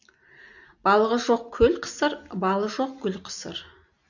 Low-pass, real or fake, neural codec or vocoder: 7.2 kHz; real; none